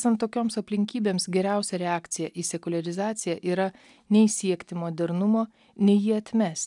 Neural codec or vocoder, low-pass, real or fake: none; 10.8 kHz; real